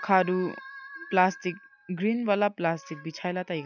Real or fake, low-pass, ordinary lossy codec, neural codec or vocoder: real; 7.2 kHz; none; none